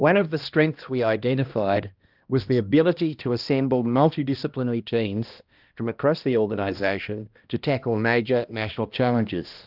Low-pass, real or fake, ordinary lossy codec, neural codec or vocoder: 5.4 kHz; fake; Opus, 24 kbps; codec, 16 kHz, 1 kbps, X-Codec, HuBERT features, trained on balanced general audio